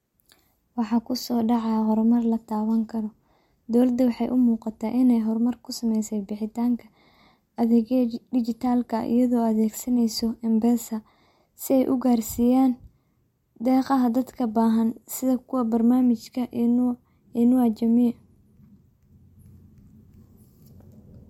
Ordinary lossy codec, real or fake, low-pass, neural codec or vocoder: MP3, 64 kbps; real; 19.8 kHz; none